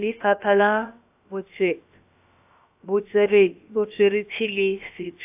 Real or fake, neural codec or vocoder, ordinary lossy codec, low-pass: fake; codec, 16 kHz, about 1 kbps, DyCAST, with the encoder's durations; AAC, 32 kbps; 3.6 kHz